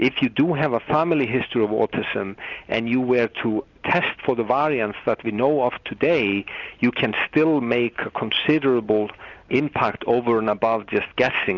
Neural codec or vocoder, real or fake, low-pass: none; real; 7.2 kHz